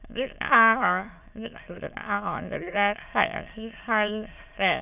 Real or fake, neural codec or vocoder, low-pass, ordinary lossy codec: fake; autoencoder, 22.05 kHz, a latent of 192 numbers a frame, VITS, trained on many speakers; 3.6 kHz; none